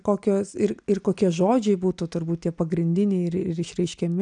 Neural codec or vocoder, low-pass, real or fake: none; 9.9 kHz; real